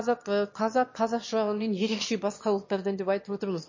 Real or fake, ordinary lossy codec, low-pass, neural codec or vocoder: fake; MP3, 32 kbps; 7.2 kHz; autoencoder, 22.05 kHz, a latent of 192 numbers a frame, VITS, trained on one speaker